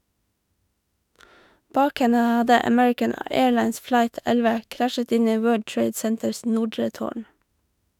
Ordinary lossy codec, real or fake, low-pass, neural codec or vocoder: none; fake; 19.8 kHz; autoencoder, 48 kHz, 32 numbers a frame, DAC-VAE, trained on Japanese speech